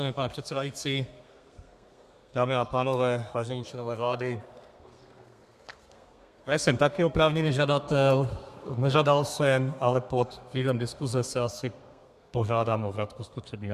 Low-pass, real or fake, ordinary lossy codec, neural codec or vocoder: 14.4 kHz; fake; MP3, 96 kbps; codec, 32 kHz, 1.9 kbps, SNAC